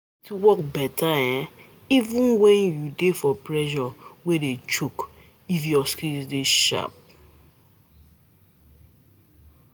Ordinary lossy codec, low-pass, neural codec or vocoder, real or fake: none; none; none; real